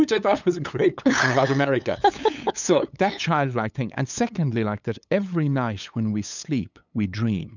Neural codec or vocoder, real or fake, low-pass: codec, 16 kHz, 8 kbps, FunCodec, trained on LibriTTS, 25 frames a second; fake; 7.2 kHz